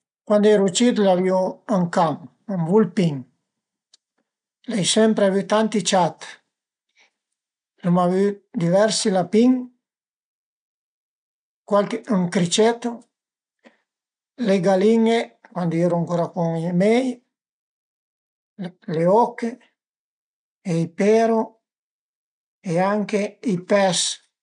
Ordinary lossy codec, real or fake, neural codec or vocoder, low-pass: none; real; none; 9.9 kHz